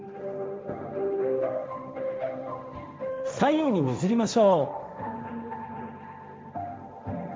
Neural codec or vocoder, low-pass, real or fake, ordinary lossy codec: codec, 16 kHz, 1.1 kbps, Voila-Tokenizer; none; fake; none